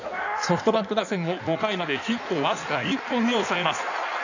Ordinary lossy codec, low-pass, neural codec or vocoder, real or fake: none; 7.2 kHz; codec, 16 kHz in and 24 kHz out, 1.1 kbps, FireRedTTS-2 codec; fake